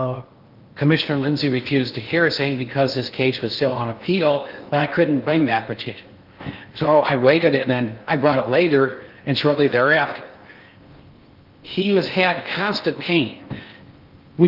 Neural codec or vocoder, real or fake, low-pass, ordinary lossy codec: codec, 16 kHz in and 24 kHz out, 0.8 kbps, FocalCodec, streaming, 65536 codes; fake; 5.4 kHz; Opus, 32 kbps